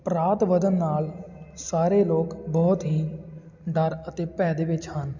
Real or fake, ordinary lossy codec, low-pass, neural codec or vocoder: real; none; 7.2 kHz; none